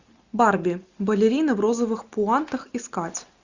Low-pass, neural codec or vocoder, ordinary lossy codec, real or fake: 7.2 kHz; none; Opus, 64 kbps; real